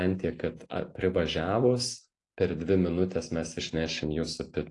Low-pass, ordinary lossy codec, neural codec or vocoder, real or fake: 10.8 kHz; AAC, 48 kbps; none; real